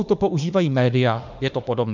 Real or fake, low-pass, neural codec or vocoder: fake; 7.2 kHz; autoencoder, 48 kHz, 32 numbers a frame, DAC-VAE, trained on Japanese speech